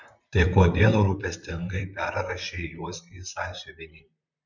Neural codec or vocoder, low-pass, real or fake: vocoder, 44.1 kHz, 128 mel bands, Pupu-Vocoder; 7.2 kHz; fake